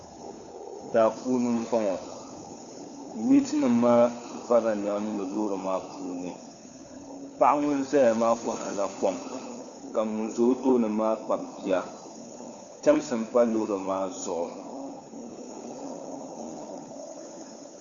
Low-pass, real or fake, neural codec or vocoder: 7.2 kHz; fake; codec, 16 kHz, 4 kbps, FunCodec, trained on LibriTTS, 50 frames a second